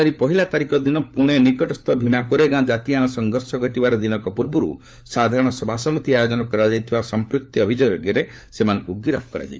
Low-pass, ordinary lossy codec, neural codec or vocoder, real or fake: none; none; codec, 16 kHz, 4 kbps, FunCodec, trained on LibriTTS, 50 frames a second; fake